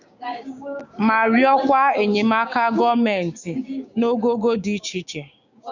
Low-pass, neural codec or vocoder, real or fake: 7.2 kHz; codec, 16 kHz, 6 kbps, DAC; fake